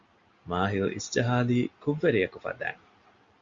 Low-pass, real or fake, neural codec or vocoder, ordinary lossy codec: 7.2 kHz; real; none; Opus, 64 kbps